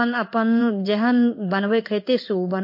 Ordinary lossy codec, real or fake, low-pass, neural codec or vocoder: MP3, 32 kbps; fake; 5.4 kHz; codec, 16 kHz in and 24 kHz out, 1 kbps, XY-Tokenizer